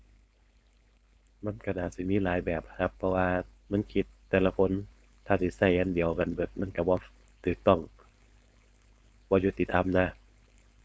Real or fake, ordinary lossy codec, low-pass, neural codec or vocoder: fake; none; none; codec, 16 kHz, 4.8 kbps, FACodec